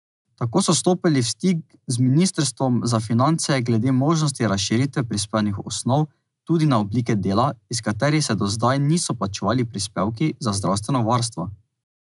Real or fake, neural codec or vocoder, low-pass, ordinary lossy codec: real; none; 10.8 kHz; none